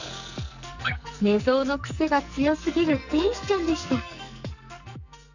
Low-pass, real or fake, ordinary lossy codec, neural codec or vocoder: 7.2 kHz; fake; none; codec, 44.1 kHz, 2.6 kbps, SNAC